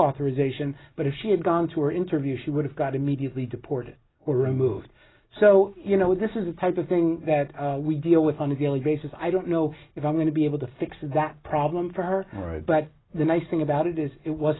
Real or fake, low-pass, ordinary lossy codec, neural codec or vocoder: real; 7.2 kHz; AAC, 16 kbps; none